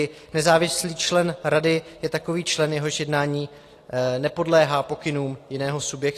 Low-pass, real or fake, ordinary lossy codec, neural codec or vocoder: 14.4 kHz; real; AAC, 48 kbps; none